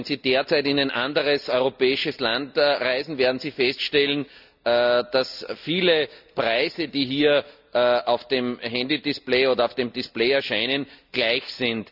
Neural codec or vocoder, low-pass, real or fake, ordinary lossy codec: none; 5.4 kHz; real; none